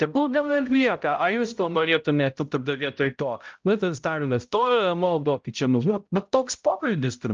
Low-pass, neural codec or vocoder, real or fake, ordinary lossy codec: 7.2 kHz; codec, 16 kHz, 0.5 kbps, X-Codec, HuBERT features, trained on balanced general audio; fake; Opus, 24 kbps